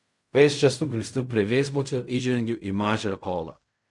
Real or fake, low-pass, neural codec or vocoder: fake; 10.8 kHz; codec, 16 kHz in and 24 kHz out, 0.4 kbps, LongCat-Audio-Codec, fine tuned four codebook decoder